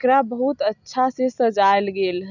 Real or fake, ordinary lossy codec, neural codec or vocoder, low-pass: real; none; none; 7.2 kHz